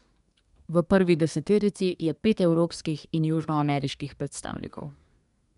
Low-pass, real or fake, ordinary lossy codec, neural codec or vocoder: 10.8 kHz; fake; none; codec, 24 kHz, 1 kbps, SNAC